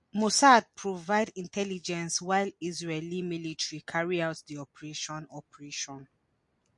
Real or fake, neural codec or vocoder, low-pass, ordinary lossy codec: real; none; 10.8 kHz; MP3, 48 kbps